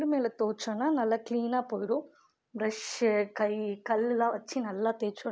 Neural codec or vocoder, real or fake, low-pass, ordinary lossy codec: none; real; none; none